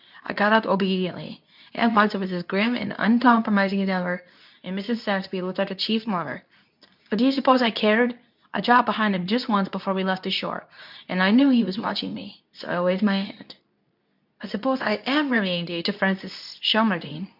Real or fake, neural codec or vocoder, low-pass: fake; codec, 24 kHz, 0.9 kbps, WavTokenizer, medium speech release version 2; 5.4 kHz